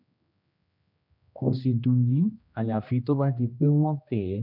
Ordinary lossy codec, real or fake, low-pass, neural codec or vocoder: none; fake; 5.4 kHz; codec, 16 kHz, 1 kbps, X-Codec, HuBERT features, trained on general audio